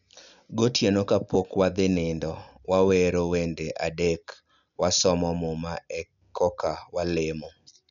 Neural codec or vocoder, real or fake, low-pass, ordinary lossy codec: none; real; 7.2 kHz; none